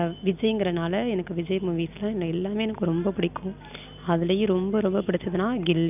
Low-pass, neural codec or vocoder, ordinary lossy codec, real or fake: 3.6 kHz; none; none; real